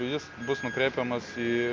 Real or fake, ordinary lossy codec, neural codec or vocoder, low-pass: real; Opus, 24 kbps; none; 7.2 kHz